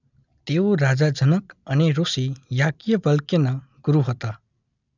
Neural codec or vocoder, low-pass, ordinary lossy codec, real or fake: none; 7.2 kHz; none; real